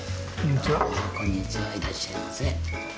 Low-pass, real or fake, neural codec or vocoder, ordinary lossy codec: none; real; none; none